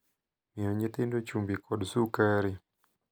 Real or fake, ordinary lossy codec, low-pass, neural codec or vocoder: real; none; none; none